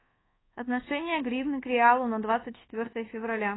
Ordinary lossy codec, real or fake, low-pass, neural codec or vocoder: AAC, 16 kbps; fake; 7.2 kHz; codec, 24 kHz, 1.2 kbps, DualCodec